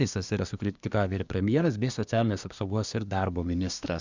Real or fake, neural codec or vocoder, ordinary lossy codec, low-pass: fake; codec, 24 kHz, 1 kbps, SNAC; Opus, 64 kbps; 7.2 kHz